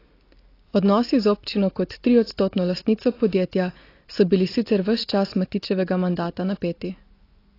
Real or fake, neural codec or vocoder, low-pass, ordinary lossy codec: real; none; 5.4 kHz; AAC, 32 kbps